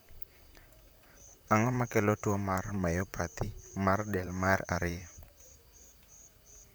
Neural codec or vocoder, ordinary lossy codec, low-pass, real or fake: vocoder, 44.1 kHz, 128 mel bands, Pupu-Vocoder; none; none; fake